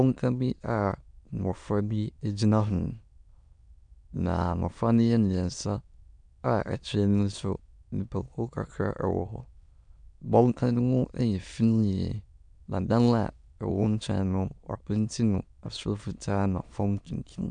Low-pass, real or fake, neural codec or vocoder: 9.9 kHz; fake; autoencoder, 22.05 kHz, a latent of 192 numbers a frame, VITS, trained on many speakers